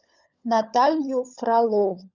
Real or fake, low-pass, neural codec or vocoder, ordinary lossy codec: fake; 7.2 kHz; codec, 16 kHz, 16 kbps, FunCodec, trained on LibriTTS, 50 frames a second; Opus, 64 kbps